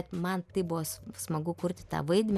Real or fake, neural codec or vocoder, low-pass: real; none; 14.4 kHz